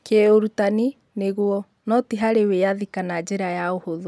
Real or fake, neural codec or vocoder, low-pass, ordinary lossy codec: real; none; none; none